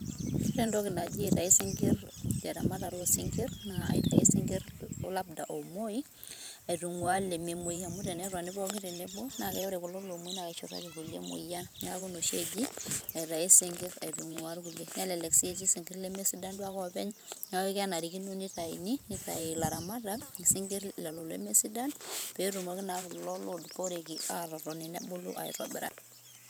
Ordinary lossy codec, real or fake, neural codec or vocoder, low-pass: none; fake; vocoder, 44.1 kHz, 128 mel bands every 512 samples, BigVGAN v2; none